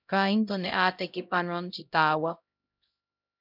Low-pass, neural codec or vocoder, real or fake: 5.4 kHz; codec, 16 kHz, 0.5 kbps, X-Codec, HuBERT features, trained on LibriSpeech; fake